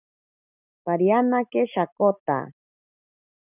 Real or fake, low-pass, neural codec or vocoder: real; 3.6 kHz; none